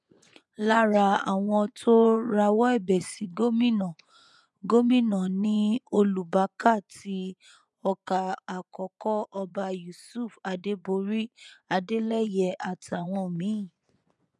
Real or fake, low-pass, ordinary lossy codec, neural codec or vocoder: fake; none; none; vocoder, 24 kHz, 100 mel bands, Vocos